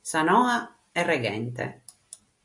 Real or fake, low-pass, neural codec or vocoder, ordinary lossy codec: real; 10.8 kHz; none; AAC, 64 kbps